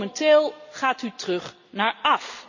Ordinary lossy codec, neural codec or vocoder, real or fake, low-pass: none; none; real; 7.2 kHz